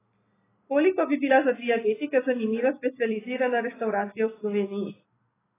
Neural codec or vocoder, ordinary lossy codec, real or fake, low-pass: none; AAC, 16 kbps; real; 3.6 kHz